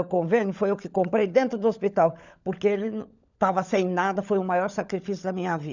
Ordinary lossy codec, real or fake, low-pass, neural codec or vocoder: none; fake; 7.2 kHz; codec, 16 kHz, 16 kbps, FunCodec, trained on LibriTTS, 50 frames a second